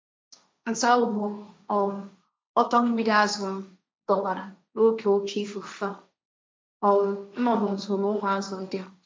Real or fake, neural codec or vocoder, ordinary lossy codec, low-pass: fake; codec, 16 kHz, 1.1 kbps, Voila-Tokenizer; none; none